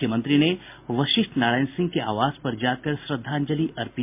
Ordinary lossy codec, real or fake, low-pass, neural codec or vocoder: MP3, 32 kbps; real; 3.6 kHz; none